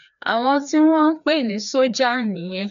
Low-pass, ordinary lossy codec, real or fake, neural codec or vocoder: 7.2 kHz; none; fake; codec, 16 kHz, 2 kbps, FreqCodec, larger model